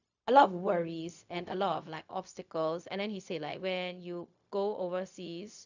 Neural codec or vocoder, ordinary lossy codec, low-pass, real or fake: codec, 16 kHz, 0.4 kbps, LongCat-Audio-Codec; none; 7.2 kHz; fake